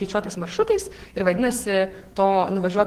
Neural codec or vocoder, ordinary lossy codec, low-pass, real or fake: codec, 44.1 kHz, 2.6 kbps, SNAC; Opus, 16 kbps; 14.4 kHz; fake